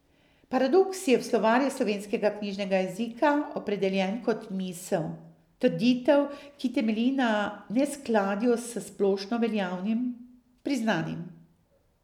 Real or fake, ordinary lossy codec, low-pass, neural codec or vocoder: real; none; 19.8 kHz; none